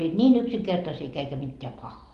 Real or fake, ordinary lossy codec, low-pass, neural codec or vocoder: real; Opus, 24 kbps; 10.8 kHz; none